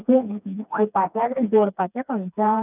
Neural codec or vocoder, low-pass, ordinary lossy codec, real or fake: codec, 16 kHz, 2 kbps, FreqCodec, smaller model; 3.6 kHz; none; fake